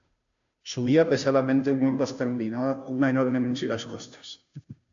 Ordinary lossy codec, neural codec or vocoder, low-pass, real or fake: AAC, 64 kbps; codec, 16 kHz, 0.5 kbps, FunCodec, trained on Chinese and English, 25 frames a second; 7.2 kHz; fake